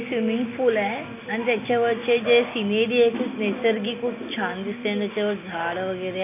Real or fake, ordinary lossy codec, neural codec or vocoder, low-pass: real; MP3, 32 kbps; none; 3.6 kHz